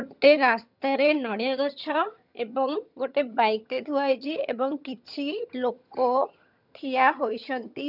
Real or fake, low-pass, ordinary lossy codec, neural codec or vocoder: fake; 5.4 kHz; none; vocoder, 22.05 kHz, 80 mel bands, HiFi-GAN